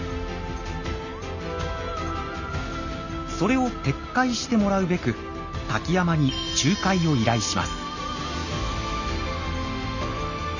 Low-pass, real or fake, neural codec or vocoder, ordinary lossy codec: 7.2 kHz; real; none; none